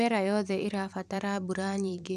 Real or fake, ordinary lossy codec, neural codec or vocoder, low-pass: fake; none; vocoder, 44.1 kHz, 128 mel bands every 512 samples, BigVGAN v2; 14.4 kHz